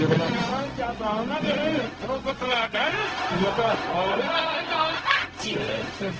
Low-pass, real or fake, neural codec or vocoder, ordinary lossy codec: 7.2 kHz; fake; codec, 16 kHz, 0.4 kbps, LongCat-Audio-Codec; Opus, 16 kbps